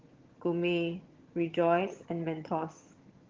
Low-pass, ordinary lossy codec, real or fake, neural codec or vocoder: 7.2 kHz; Opus, 16 kbps; fake; vocoder, 22.05 kHz, 80 mel bands, HiFi-GAN